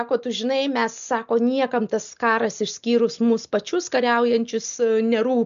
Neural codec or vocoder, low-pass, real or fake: none; 7.2 kHz; real